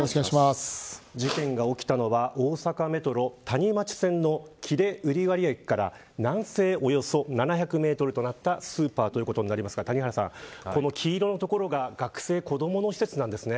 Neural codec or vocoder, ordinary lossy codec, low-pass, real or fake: none; none; none; real